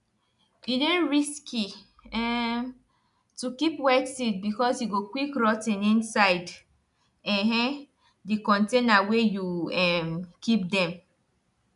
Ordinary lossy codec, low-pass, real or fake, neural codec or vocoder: none; 10.8 kHz; real; none